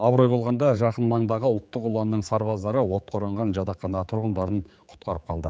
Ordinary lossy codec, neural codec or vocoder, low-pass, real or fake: none; codec, 16 kHz, 4 kbps, X-Codec, HuBERT features, trained on general audio; none; fake